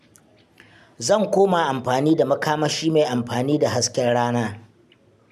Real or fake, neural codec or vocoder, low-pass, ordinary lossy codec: real; none; 14.4 kHz; none